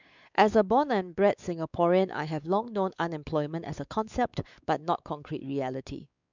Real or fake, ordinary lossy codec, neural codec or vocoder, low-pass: fake; none; codec, 16 kHz, 4 kbps, X-Codec, WavLM features, trained on Multilingual LibriSpeech; 7.2 kHz